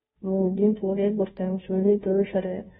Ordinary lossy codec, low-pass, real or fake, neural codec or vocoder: AAC, 16 kbps; 7.2 kHz; fake; codec, 16 kHz, 0.5 kbps, FunCodec, trained on Chinese and English, 25 frames a second